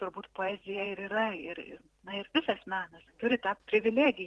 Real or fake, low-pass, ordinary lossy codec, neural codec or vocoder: fake; 10.8 kHz; Opus, 16 kbps; vocoder, 44.1 kHz, 128 mel bands every 512 samples, BigVGAN v2